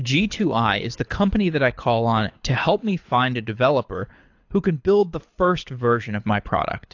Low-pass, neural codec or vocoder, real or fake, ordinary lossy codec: 7.2 kHz; codec, 24 kHz, 6 kbps, HILCodec; fake; AAC, 48 kbps